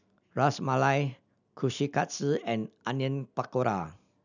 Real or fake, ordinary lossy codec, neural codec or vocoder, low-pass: real; none; none; 7.2 kHz